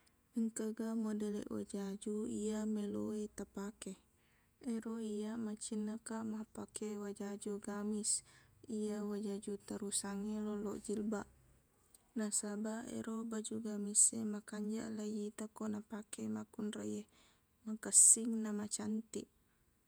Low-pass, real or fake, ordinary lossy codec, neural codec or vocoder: none; fake; none; vocoder, 48 kHz, 128 mel bands, Vocos